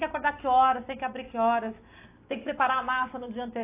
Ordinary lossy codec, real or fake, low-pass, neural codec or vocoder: AAC, 24 kbps; real; 3.6 kHz; none